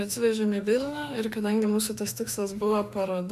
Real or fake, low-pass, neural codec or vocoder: fake; 14.4 kHz; autoencoder, 48 kHz, 32 numbers a frame, DAC-VAE, trained on Japanese speech